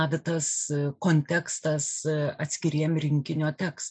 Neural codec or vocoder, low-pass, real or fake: none; 9.9 kHz; real